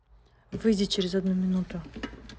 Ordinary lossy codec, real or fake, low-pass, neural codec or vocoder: none; real; none; none